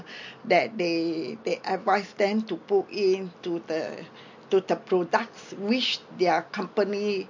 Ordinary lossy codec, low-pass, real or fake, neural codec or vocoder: MP3, 48 kbps; 7.2 kHz; real; none